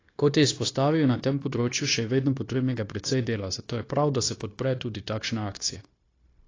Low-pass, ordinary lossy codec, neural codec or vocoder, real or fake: 7.2 kHz; AAC, 32 kbps; codec, 16 kHz, 0.9 kbps, LongCat-Audio-Codec; fake